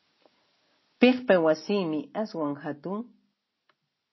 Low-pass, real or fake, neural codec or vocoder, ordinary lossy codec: 7.2 kHz; real; none; MP3, 24 kbps